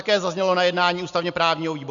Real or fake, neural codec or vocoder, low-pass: real; none; 7.2 kHz